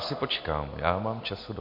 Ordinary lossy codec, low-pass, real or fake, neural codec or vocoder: MP3, 32 kbps; 5.4 kHz; real; none